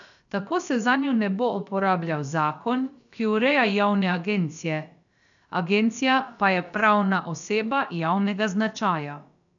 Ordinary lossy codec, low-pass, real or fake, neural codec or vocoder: none; 7.2 kHz; fake; codec, 16 kHz, about 1 kbps, DyCAST, with the encoder's durations